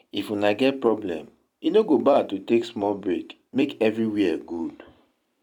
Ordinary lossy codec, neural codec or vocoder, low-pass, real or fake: none; vocoder, 44.1 kHz, 128 mel bands every 256 samples, BigVGAN v2; 19.8 kHz; fake